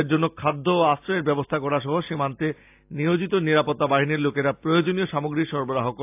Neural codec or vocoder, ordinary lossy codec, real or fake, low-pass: none; AAC, 32 kbps; real; 3.6 kHz